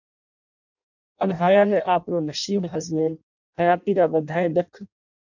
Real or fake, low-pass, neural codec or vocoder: fake; 7.2 kHz; codec, 16 kHz in and 24 kHz out, 0.6 kbps, FireRedTTS-2 codec